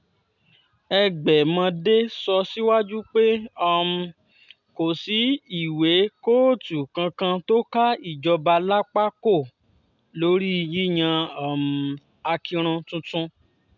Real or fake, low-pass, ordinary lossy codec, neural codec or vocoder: real; 7.2 kHz; none; none